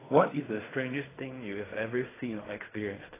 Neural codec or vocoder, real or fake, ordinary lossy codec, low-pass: codec, 16 kHz in and 24 kHz out, 0.4 kbps, LongCat-Audio-Codec, fine tuned four codebook decoder; fake; AAC, 16 kbps; 3.6 kHz